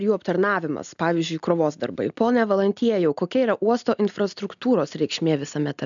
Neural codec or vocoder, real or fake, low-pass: none; real; 7.2 kHz